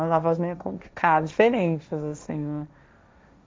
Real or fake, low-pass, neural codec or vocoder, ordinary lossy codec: fake; none; codec, 16 kHz, 1.1 kbps, Voila-Tokenizer; none